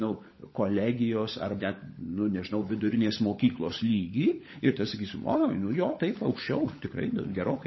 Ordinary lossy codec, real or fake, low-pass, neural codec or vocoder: MP3, 24 kbps; fake; 7.2 kHz; codec, 16 kHz, 16 kbps, FunCodec, trained on LibriTTS, 50 frames a second